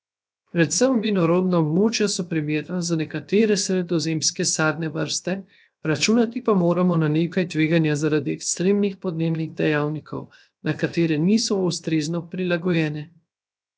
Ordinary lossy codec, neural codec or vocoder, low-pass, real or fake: none; codec, 16 kHz, 0.7 kbps, FocalCodec; none; fake